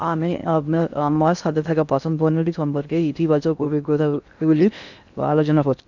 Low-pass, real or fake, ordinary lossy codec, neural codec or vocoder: 7.2 kHz; fake; none; codec, 16 kHz in and 24 kHz out, 0.6 kbps, FocalCodec, streaming, 2048 codes